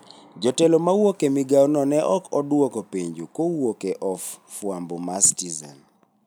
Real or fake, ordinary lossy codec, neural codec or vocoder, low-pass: real; none; none; none